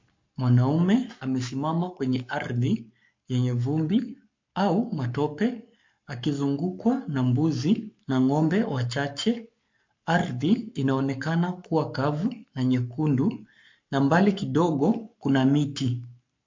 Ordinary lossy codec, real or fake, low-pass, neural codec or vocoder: MP3, 48 kbps; fake; 7.2 kHz; codec, 44.1 kHz, 7.8 kbps, Pupu-Codec